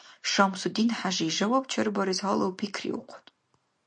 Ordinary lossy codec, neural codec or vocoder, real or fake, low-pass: MP3, 48 kbps; none; real; 9.9 kHz